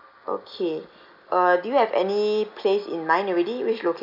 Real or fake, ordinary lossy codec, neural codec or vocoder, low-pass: real; none; none; 5.4 kHz